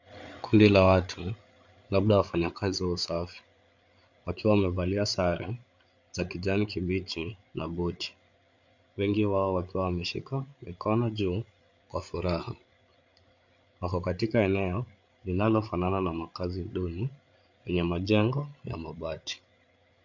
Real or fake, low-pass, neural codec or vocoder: fake; 7.2 kHz; codec, 16 kHz, 8 kbps, FreqCodec, larger model